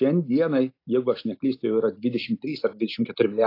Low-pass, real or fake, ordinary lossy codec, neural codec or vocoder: 5.4 kHz; real; AAC, 32 kbps; none